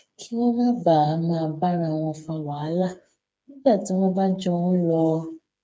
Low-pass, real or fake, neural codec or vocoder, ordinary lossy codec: none; fake; codec, 16 kHz, 4 kbps, FreqCodec, smaller model; none